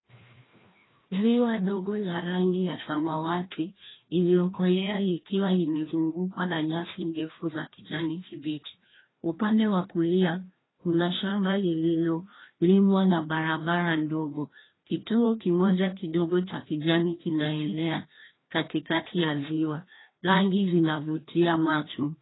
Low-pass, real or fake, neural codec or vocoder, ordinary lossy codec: 7.2 kHz; fake; codec, 16 kHz, 1 kbps, FreqCodec, larger model; AAC, 16 kbps